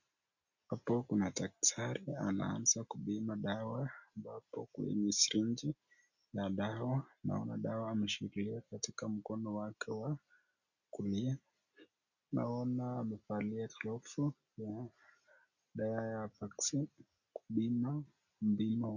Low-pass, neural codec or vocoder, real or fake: 7.2 kHz; none; real